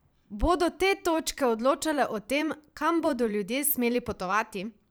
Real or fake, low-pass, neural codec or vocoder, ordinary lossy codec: fake; none; vocoder, 44.1 kHz, 128 mel bands every 512 samples, BigVGAN v2; none